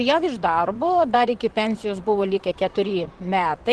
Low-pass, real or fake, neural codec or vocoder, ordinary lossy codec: 10.8 kHz; fake; vocoder, 44.1 kHz, 128 mel bands, Pupu-Vocoder; Opus, 16 kbps